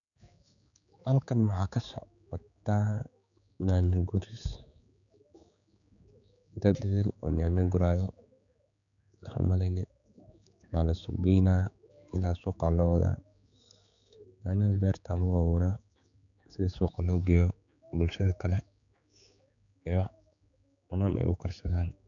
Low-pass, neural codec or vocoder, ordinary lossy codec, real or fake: 7.2 kHz; codec, 16 kHz, 4 kbps, X-Codec, HuBERT features, trained on general audio; none; fake